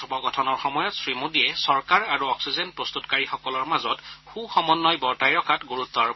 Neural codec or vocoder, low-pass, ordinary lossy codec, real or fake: none; 7.2 kHz; MP3, 24 kbps; real